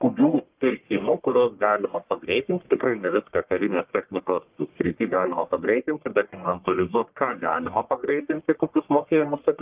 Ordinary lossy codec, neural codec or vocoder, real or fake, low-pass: Opus, 32 kbps; codec, 44.1 kHz, 1.7 kbps, Pupu-Codec; fake; 3.6 kHz